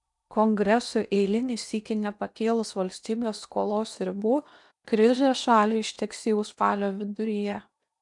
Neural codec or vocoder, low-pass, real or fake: codec, 16 kHz in and 24 kHz out, 0.8 kbps, FocalCodec, streaming, 65536 codes; 10.8 kHz; fake